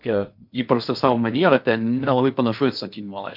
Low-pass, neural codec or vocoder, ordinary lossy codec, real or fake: 5.4 kHz; codec, 16 kHz in and 24 kHz out, 0.8 kbps, FocalCodec, streaming, 65536 codes; MP3, 48 kbps; fake